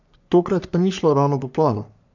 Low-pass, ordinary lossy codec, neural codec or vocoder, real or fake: 7.2 kHz; none; codec, 44.1 kHz, 3.4 kbps, Pupu-Codec; fake